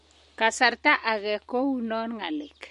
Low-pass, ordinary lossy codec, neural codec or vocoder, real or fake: 14.4 kHz; MP3, 48 kbps; none; real